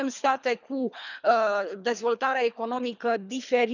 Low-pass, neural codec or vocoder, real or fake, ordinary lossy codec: 7.2 kHz; codec, 24 kHz, 3 kbps, HILCodec; fake; none